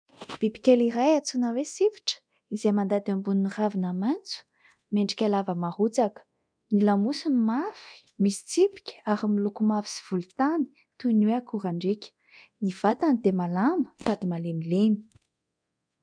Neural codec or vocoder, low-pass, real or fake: codec, 24 kHz, 0.9 kbps, DualCodec; 9.9 kHz; fake